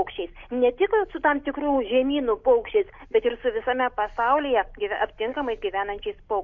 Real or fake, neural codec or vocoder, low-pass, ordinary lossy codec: real; none; 7.2 kHz; MP3, 48 kbps